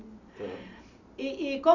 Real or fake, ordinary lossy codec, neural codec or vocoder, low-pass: real; none; none; 7.2 kHz